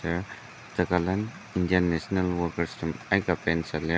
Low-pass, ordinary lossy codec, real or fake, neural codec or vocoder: none; none; real; none